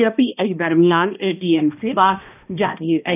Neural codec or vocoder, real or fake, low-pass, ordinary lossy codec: codec, 16 kHz, 1 kbps, X-Codec, HuBERT features, trained on balanced general audio; fake; 3.6 kHz; none